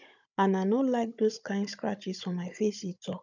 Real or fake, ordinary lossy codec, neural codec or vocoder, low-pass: fake; none; codec, 16 kHz, 16 kbps, FunCodec, trained on Chinese and English, 50 frames a second; 7.2 kHz